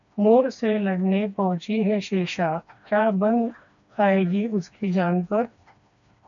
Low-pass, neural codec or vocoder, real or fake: 7.2 kHz; codec, 16 kHz, 2 kbps, FreqCodec, smaller model; fake